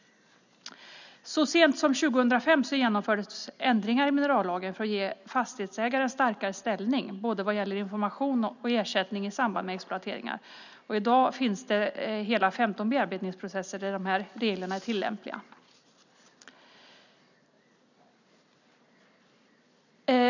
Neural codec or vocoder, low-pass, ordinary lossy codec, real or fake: none; 7.2 kHz; none; real